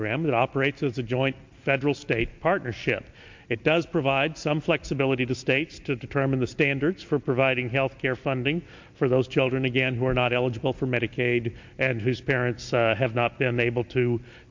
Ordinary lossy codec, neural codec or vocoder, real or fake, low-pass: MP3, 48 kbps; none; real; 7.2 kHz